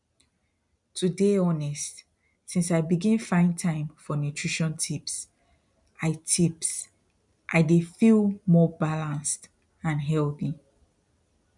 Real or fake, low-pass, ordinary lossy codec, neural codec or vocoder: real; 10.8 kHz; none; none